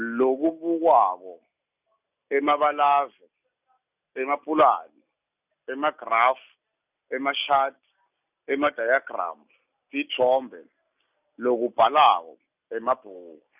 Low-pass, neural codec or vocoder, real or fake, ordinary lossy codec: 3.6 kHz; none; real; none